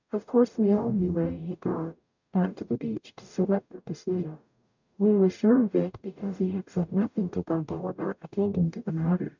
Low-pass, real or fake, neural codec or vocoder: 7.2 kHz; fake; codec, 44.1 kHz, 0.9 kbps, DAC